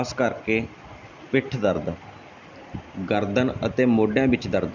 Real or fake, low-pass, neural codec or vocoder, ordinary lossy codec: real; 7.2 kHz; none; none